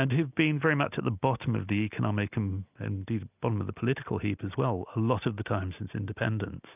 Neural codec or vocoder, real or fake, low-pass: none; real; 3.6 kHz